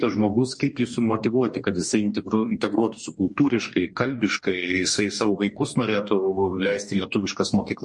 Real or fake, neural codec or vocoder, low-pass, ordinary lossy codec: fake; codec, 44.1 kHz, 2.6 kbps, DAC; 10.8 kHz; MP3, 48 kbps